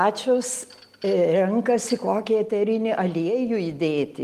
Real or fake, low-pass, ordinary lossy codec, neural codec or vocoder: real; 14.4 kHz; Opus, 24 kbps; none